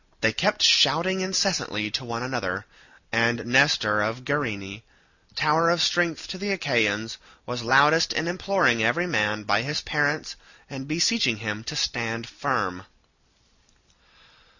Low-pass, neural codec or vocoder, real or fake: 7.2 kHz; none; real